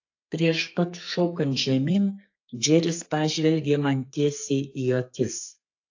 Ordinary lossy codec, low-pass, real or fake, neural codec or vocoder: AAC, 48 kbps; 7.2 kHz; fake; codec, 32 kHz, 1.9 kbps, SNAC